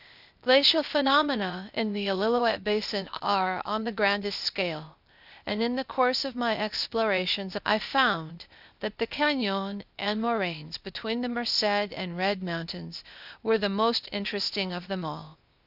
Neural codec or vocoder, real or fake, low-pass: codec, 16 kHz, 0.8 kbps, ZipCodec; fake; 5.4 kHz